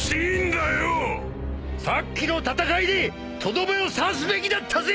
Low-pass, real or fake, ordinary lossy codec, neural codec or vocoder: none; real; none; none